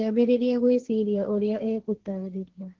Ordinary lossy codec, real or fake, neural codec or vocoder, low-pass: Opus, 16 kbps; fake; codec, 16 kHz, 1.1 kbps, Voila-Tokenizer; 7.2 kHz